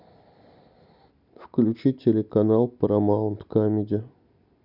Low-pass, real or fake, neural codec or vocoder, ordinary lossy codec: 5.4 kHz; real; none; none